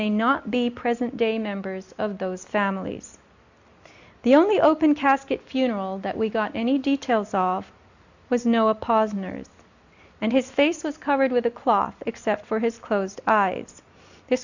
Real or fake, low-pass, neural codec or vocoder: real; 7.2 kHz; none